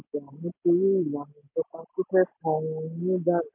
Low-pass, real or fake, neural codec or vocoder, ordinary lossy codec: 3.6 kHz; real; none; MP3, 24 kbps